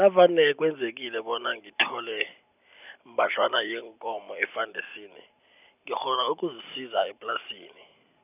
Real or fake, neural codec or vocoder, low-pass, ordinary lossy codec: real; none; 3.6 kHz; none